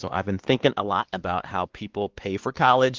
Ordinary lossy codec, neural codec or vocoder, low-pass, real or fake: Opus, 16 kbps; codec, 16 kHz, 2 kbps, X-Codec, WavLM features, trained on Multilingual LibriSpeech; 7.2 kHz; fake